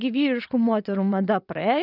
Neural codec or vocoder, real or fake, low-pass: vocoder, 22.05 kHz, 80 mel bands, WaveNeXt; fake; 5.4 kHz